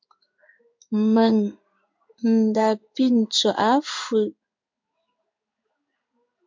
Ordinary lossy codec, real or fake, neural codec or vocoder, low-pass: MP3, 64 kbps; fake; codec, 16 kHz in and 24 kHz out, 1 kbps, XY-Tokenizer; 7.2 kHz